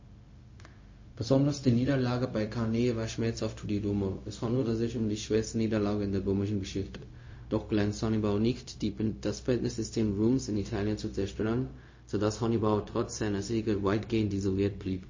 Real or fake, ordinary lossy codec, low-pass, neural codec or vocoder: fake; MP3, 32 kbps; 7.2 kHz; codec, 16 kHz, 0.4 kbps, LongCat-Audio-Codec